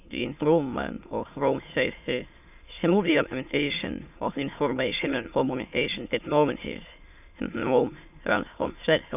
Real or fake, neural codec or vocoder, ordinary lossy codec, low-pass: fake; autoencoder, 22.05 kHz, a latent of 192 numbers a frame, VITS, trained on many speakers; none; 3.6 kHz